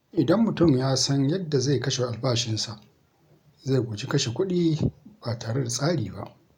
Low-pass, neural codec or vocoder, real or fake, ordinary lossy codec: 19.8 kHz; none; real; none